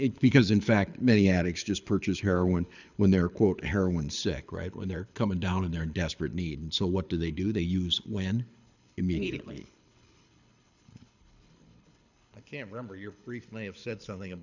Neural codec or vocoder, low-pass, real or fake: codec, 24 kHz, 6 kbps, HILCodec; 7.2 kHz; fake